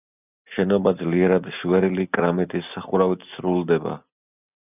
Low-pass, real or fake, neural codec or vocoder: 3.6 kHz; real; none